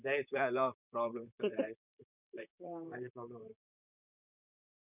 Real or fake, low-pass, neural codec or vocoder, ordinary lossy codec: fake; 3.6 kHz; vocoder, 44.1 kHz, 128 mel bands, Pupu-Vocoder; none